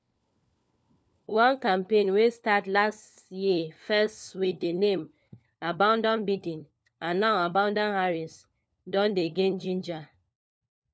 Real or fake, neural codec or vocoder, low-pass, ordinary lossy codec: fake; codec, 16 kHz, 4 kbps, FunCodec, trained on LibriTTS, 50 frames a second; none; none